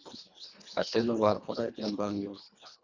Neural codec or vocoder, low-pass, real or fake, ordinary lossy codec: codec, 24 kHz, 1.5 kbps, HILCodec; 7.2 kHz; fake; Opus, 64 kbps